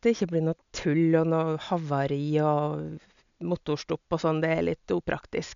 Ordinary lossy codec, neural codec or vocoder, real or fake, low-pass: MP3, 96 kbps; none; real; 7.2 kHz